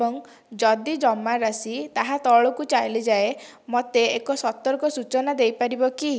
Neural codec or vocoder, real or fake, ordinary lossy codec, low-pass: none; real; none; none